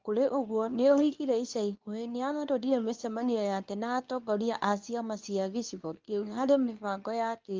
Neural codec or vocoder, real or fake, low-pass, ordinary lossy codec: codec, 24 kHz, 0.9 kbps, WavTokenizer, medium speech release version 2; fake; 7.2 kHz; Opus, 24 kbps